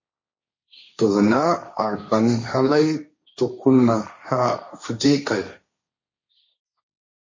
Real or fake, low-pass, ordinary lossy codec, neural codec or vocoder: fake; 7.2 kHz; MP3, 32 kbps; codec, 16 kHz, 1.1 kbps, Voila-Tokenizer